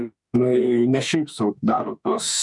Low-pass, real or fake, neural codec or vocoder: 10.8 kHz; fake; autoencoder, 48 kHz, 32 numbers a frame, DAC-VAE, trained on Japanese speech